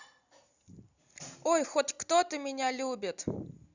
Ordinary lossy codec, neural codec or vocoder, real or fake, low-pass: Opus, 64 kbps; none; real; 7.2 kHz